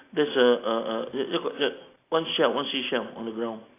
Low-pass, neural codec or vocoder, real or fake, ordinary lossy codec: 3.6 kHz; none; real; AAC, 24 kbps